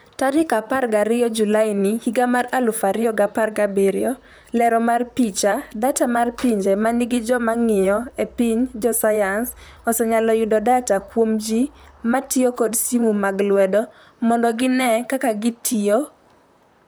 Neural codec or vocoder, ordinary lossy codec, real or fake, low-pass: vocoder, 44.1 kHz, 128 mel bands, Pupu-Vocoder; none; fake; none